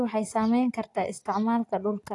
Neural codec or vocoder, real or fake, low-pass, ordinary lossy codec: none; real; 10.8 kHz; AAC, 48 kbps